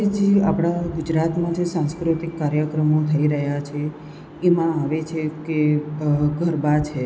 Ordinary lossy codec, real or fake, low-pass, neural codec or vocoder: none; real; none; none